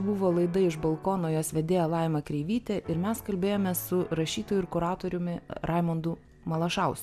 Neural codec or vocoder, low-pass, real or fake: none; 14.4 kHz; real